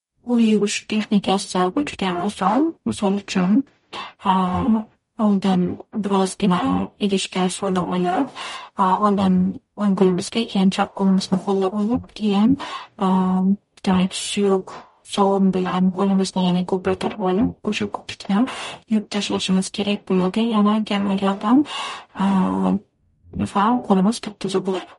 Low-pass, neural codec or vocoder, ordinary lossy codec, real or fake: 19.8 kHz; codec, 44.1 kHz, 0.9 kbps, DAC; MP3, 48 kbps; fake